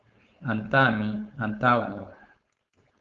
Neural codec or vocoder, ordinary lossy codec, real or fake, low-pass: codec, 16 kHz, 4.8 kbps, FACodec; Opus, 32 kbps; fake; 7.2 kHz